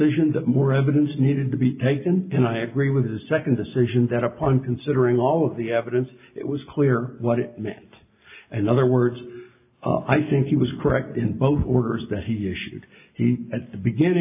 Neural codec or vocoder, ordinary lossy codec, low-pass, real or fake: none; AAC, 32 kbps; 3.6 kHz; real